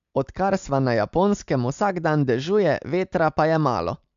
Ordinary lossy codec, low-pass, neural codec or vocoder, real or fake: MP3, 64 kbps; 7.2 kHz; none; real